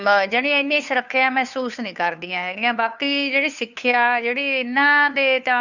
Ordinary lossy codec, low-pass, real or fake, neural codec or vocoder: none; 7.2 kHz; fake; codec, 16 kHz, 2 kbps, FunCodec, trained on Chinese and English, 25 frames a second